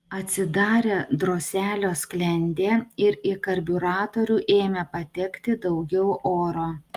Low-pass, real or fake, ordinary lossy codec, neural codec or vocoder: 14.4 kHz; real; Opus, 32 kbps; none